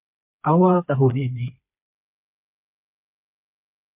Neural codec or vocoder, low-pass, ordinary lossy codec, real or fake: codec, 16 kHz, 4 kbps, FreqCodec, smaller model; 3.6 kHz; MP3, 32 kbps; fake